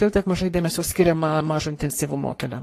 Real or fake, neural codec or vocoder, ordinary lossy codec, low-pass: fake; codec, 44.1 kHz, 3.4 kbps, Pupu-Codec; AAC, 48 kbps; 14.4 kHz